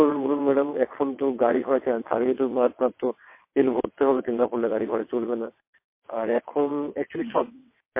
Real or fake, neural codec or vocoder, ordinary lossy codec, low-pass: fake; vocoder, 22.05 kHz, 80 mel bands, WaveNeXt; MP3, 24 kbps; 3.6 kHz